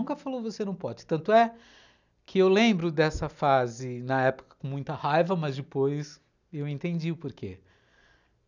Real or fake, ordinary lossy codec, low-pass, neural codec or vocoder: real; none; 7.2 kHz; none